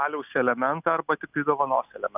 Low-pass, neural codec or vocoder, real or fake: 3.6 kHz; none; real